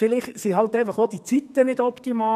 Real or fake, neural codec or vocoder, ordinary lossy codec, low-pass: fake; codec, 32 kHz, 1.9 kbps, SNAC; none; 14.4 kHz